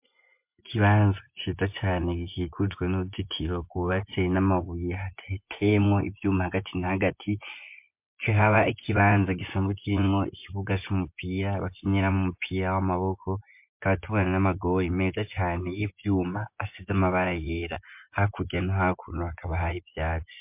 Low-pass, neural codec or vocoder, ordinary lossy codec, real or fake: 3.6 kHz; vocoder, 24 kHz, 100 mel bands, Vocos; MP3, 32 kbps; fake